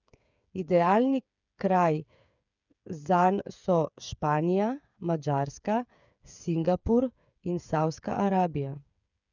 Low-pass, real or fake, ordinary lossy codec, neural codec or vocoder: 7.2 kHz; fake; none; codec, 16 kHz, 8 kbps, FreqCodec, smaller model